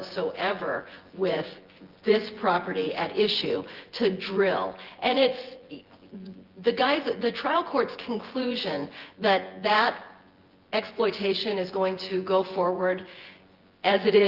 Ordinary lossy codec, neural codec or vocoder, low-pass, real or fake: Opus, 16 kbps; vocoder, 24 kHz, 100 mel bands, Vocos; 5.4 kHz; fake